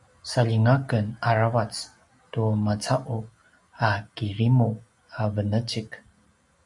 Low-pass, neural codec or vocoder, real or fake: 10.8 kHz; none; real